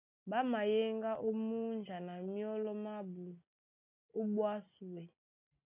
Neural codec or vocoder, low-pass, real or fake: none; 3.6 kHz; real